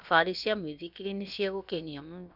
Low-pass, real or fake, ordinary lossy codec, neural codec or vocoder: 5.4 kHz; fake; none; codec, 16 kHz, about 1 kbps, DyCAST, with the encoder's durations